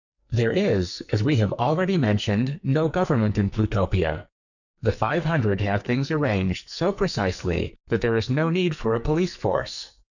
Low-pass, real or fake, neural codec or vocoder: 7.2 kHz; fake; codec, 44.1 kHz, 2.6 kbps, SNAC